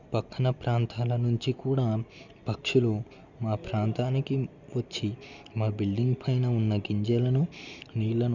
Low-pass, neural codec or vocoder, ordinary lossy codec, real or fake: 7.2 kHz; none; none; real